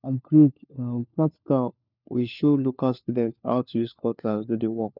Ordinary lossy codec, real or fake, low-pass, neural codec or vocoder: none; fake; 5.4 kHz; codec, 16 kHz, 4 kbps, FunCodec, trained on LibriTTS, 50 frames a second